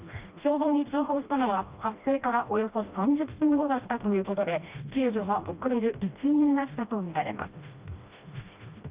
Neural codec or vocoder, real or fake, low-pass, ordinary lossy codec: codec, 16 kHz, 1 kbps, FreqCodec, smaller model; fake; 3.6 kHz; Opus, 24 kbps